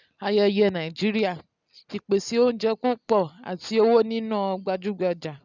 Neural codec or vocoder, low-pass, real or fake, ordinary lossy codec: none; 7.2 kHz; real; none